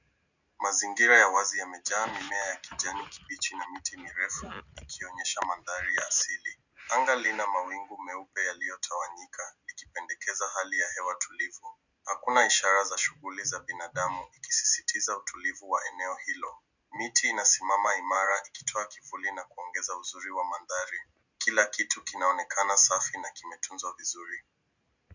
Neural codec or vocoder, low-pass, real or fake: none; 7.2 kHz; real